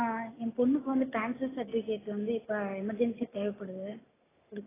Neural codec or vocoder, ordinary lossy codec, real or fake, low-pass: none; AAC, 16 kbps; real; 3.6 kHz